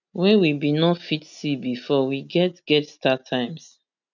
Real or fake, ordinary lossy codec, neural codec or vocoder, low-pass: real; none; none; 7.2 kHz